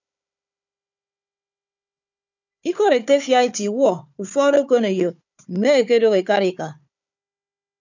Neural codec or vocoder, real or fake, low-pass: codec, 16 kHz, 4 kbps, FunCodec, trained on Chinese and English, 50 frames a second; fake; 7.2 kHz